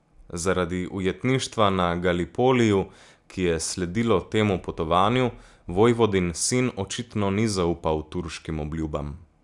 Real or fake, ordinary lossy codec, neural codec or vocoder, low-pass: real; none; none; 10.8 kHz